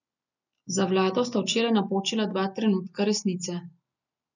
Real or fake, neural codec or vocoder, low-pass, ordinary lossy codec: real; none; 7.2 kHz; none